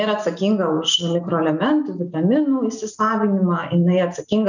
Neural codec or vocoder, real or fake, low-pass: none; real; 7.2 kHz